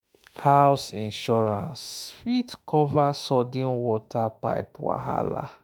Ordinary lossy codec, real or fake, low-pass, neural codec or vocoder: none; fake; none; autoencoder, 48 kHz, 32 numbers a frame, DAC-VAE, trained on Japanese speech